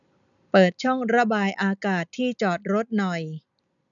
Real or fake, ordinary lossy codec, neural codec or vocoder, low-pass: real; none; none; 7.2 kHz